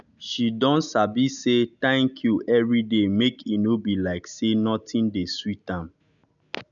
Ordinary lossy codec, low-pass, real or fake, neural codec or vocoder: none; 7.2 kHz; real; none